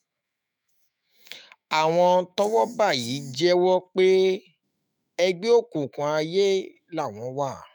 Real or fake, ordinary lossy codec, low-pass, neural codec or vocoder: fake; none; 19.8 kHz; autoencoder, 48 kHz, 128 numbers a frame, DAC-VAE, trained on Japanese speech